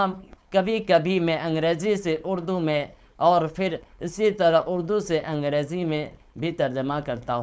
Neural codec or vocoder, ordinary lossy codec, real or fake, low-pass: codec, 16 kHz, 4.8 kbps, FACodec; none; fake; none